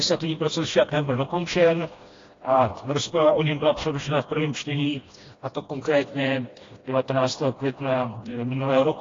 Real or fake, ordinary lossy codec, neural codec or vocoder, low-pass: fake; AAC, 32 kbps; codec, 16 kHz, 1 kbps, FreqCodec, smaller model; 7.2 kHz